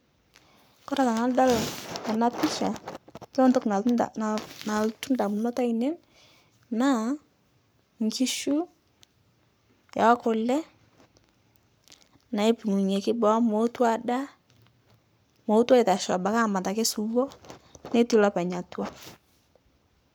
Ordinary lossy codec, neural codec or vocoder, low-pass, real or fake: none; codec, 44.1 kHz, 7.8 kbps, Pupu-Codec; none; fake